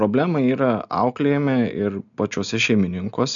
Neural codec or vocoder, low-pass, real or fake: none; 7.2 kHz; real